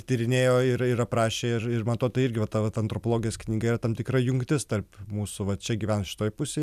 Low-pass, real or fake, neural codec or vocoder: 14.4 kHz; real; none